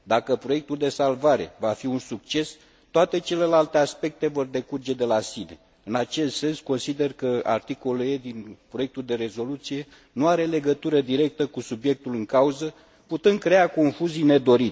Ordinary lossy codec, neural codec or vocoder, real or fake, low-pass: none; none; real; none